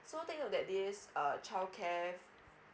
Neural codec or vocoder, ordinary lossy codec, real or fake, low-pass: none; none; real; none